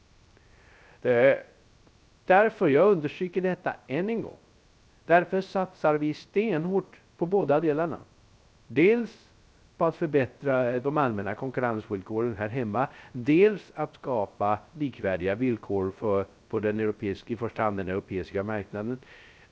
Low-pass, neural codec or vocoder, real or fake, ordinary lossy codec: none; codec, 16 kHz, 0.3 kbps, FocalCodec; fake; none